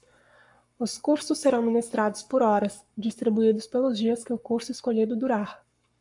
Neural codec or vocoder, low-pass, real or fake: codec, 44.1 kHz, 7.8 kbps, Pupu-Codec; 10.8 kHz; fake